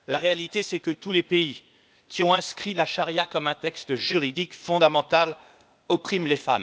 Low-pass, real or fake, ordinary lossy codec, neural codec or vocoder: none; fake; none; codec, 16 kHz, 0.8 kbps, ZipCodec